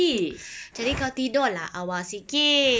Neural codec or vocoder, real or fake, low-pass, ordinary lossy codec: none; real; none; none